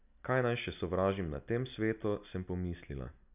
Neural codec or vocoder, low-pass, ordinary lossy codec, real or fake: none; 3.6 kHz; none; real